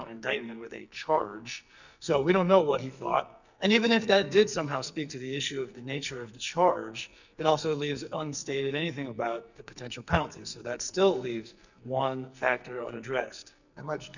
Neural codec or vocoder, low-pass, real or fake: codec, 44.1 kHz, 2.6 kbps, SNAC; 7.2 kHz; fake